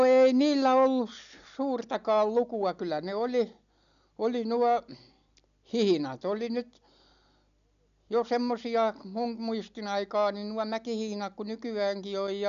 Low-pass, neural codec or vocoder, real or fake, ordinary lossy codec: 7.2 kHz; none; real; none